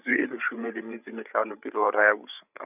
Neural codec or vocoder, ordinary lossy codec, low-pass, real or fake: codec, 16 kHz, 8 kbps, FreqCodec, larger model; none; 3.6 kHz; fake